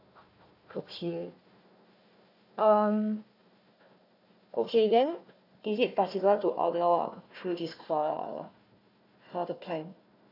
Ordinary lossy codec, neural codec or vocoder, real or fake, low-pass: AAC, 48 kbps; codec, 16 kHz, 1 kbps, FunCodec, trained on Chinese and English, 50 frames a second; fake; 5.4 kHz